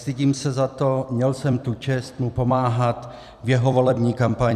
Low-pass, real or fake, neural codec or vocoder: 14.4 kHz; fake; vocoder, 44.1 kHz, 128 mel bands every 256 samples, BigVGAN v2